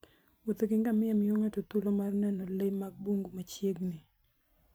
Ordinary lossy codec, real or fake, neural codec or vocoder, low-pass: none; fake; vocoder, 44.1 kHz, 128 mel bands every 512 samples, BigVGAN v2; none